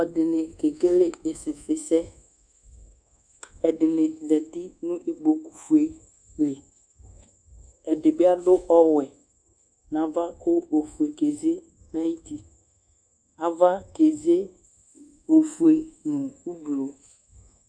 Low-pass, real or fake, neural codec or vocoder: 9.9 kHz; fake; codec, 24 kHz, 1.2 kbps, DualCodec